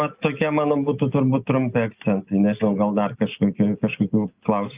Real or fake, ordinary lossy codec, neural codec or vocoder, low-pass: real; Opus, 24 kbps; none; 3.6 kHz